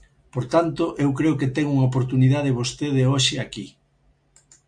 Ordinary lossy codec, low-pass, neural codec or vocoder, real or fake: MP3, 64 kbps; 9.9 kHz; none; real